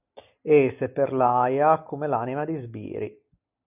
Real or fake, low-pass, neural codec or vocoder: real; 3.6 kHz; none